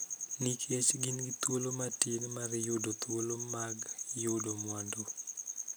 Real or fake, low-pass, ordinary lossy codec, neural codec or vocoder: real; none; none; none